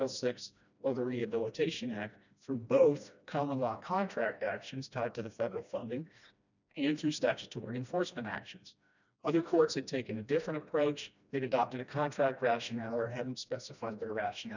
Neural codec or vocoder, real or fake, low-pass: codec, 16 kHz, 1 kbps, FreqCodec, smaller model; fake; 7.2 kHz